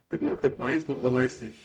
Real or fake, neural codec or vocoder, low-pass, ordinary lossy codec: fake; codec, 44.1 kHz, 0.9 kbps, DAC; 19.8 kHz; none